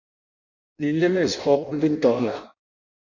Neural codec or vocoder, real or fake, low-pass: codec, 16 kHz in and 24 kHz out, 0.6 kbps, FireRedTTS-2 codec; fake; 7.2 kHz